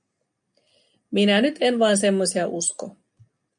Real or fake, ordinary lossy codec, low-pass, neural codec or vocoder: real; MP3, 96 kbps; 9.9 kHz; none